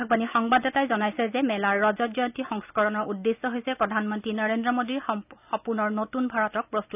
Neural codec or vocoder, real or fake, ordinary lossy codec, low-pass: none; real; none; 3.6 kHz